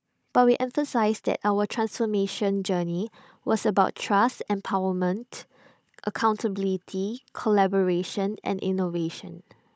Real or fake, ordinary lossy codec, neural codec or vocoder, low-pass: fake; none; codec, 16 kHz, 16 kbps, FunCodec, trained on Chinese and English, 50 frames a second; none